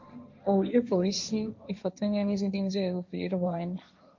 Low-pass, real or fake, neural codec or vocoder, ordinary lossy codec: 7.2 kHz; fake; codec, 16 kHz, 1.1 kbps, Voila-Tokenizer; MP3, 64 kbps